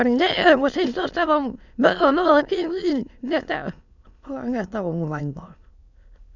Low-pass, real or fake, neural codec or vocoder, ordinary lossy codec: 7.2 kHz; fake; autoencoder, 22.05 kHz, a latent of 192 numbers a frame, VITS, trained on many speakers; none